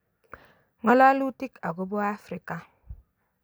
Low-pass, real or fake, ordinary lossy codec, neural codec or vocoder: none; real; none; none